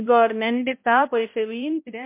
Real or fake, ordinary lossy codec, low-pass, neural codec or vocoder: fake; MP3, 32 kbps; 3.6 kHz; codec, 16 kHz, 0.5 kbps, X-Codec, HuBERT features, trained on balanced general audio